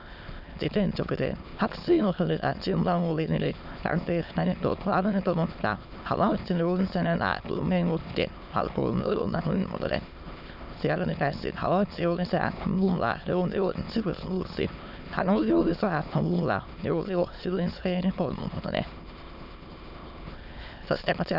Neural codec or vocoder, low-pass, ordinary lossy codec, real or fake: autoencoder, 22.05 kHz, a latent of 192 numbers a frame, VITS, trained on many speakers; 5.4 kHz; none; fake